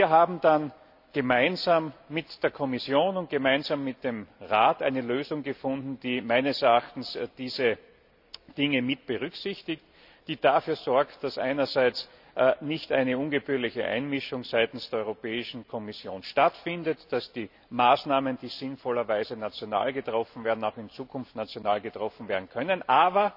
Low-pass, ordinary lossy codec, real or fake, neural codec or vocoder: 5.4 kHz; none; real; none